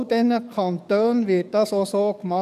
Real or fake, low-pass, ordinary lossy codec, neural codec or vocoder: fake; 14.4 kHz; none; codec, 44.1 kHz, 7.8 kbps, DAC